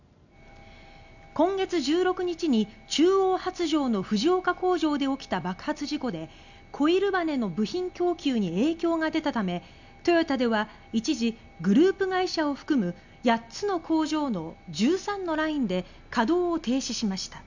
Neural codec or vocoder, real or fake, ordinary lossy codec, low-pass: none; real; none; 7.2 kHz